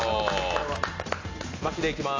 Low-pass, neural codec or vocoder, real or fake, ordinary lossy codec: 7.2 kHz; none; real; AAC, 32 kbps